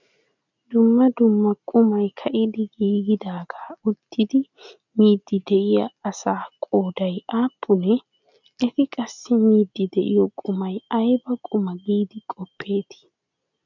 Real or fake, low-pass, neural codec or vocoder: real; 7.2 kHz; none